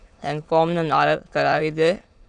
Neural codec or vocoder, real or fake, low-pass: autoencoder, 22.05 kHz, a latent of 192 numbers a frame, VITS, trained on many speakers; fake; 9.9 kHz